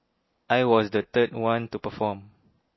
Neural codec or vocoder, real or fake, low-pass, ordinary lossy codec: none; real; 7.2 kHz; MP3, 24 kbps